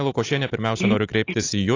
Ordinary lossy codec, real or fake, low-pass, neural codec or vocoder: AAC, 32 kbps; real; 7.2 kHz; none